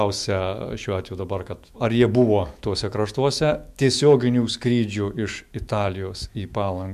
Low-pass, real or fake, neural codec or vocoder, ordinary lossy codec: 14.4 kHz; fake; autoencoder, 48 kHz, 128 numbers a frame, DAC-VAE, trained on Japanese speech; MP3, 96 kbps